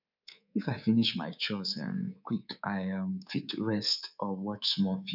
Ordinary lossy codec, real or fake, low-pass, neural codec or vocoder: none; fake; 5.4 kHz; codec, 24 kHz, 3.1 kbps, DualCodec